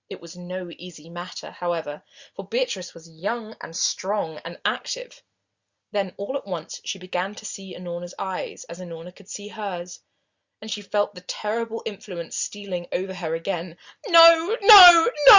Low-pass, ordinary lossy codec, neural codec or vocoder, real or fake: 7.2 kHz; Opus, 64 kbps; none; real